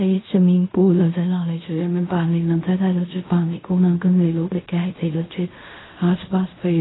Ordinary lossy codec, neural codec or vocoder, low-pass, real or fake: AAC, 16 kbps; codec, 16 kHz in and 24 kHz out, 0.4 kbps, LongCat-Audio-Codec, fine tuned four codebook decoder; 7.2 kHz; fake